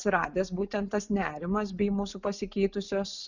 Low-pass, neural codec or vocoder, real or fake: 7.2 kHz; none; real